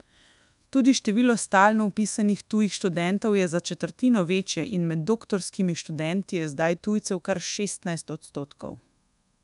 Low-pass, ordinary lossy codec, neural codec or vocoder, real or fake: 10.8 kHz; none; codec, 24 kHz, 1.2 kbps, DualCodec; fake